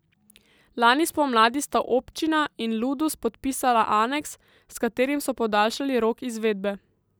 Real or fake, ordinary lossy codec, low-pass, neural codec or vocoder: real; none; none; none